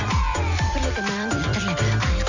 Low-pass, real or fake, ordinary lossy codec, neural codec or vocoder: 7.2 kHz; fake; none; vocoder, 44.1 kHz, 128 mel bands every 256 samples, BigVGAN v2